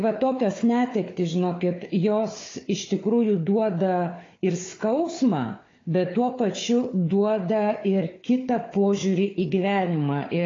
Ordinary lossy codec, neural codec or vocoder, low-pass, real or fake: AAC, 32 kbps; codec, 16 kHz, 4 kbps, FunCodec, trained on Chinese and English, 50 frames a second; 7.2 kHz; fake